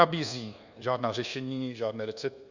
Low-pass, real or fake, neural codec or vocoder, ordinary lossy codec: 7.2 kHz; fake; codec, 24 kHz, 1.2 kbps, DualCodec; Opus, 64 kbps